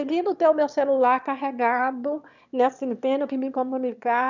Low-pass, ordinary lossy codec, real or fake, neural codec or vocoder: 7.2 kHz; none; fake; autoencoder, 22.05 kHz, a latent of 192 numbers a frame, VITS, trained on one speaker